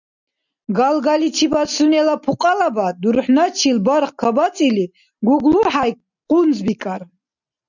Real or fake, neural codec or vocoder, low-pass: real; none; 7.2 kHz